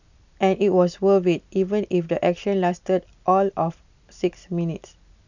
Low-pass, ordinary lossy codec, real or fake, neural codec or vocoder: 7.2 kHz; none; real; none